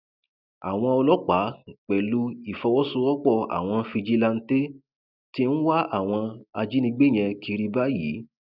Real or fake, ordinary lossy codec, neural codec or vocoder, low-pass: real; none; none; 5.4 kHz